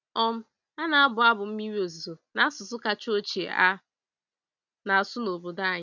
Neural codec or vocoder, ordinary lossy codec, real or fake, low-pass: none; none; real; 7.2 kHz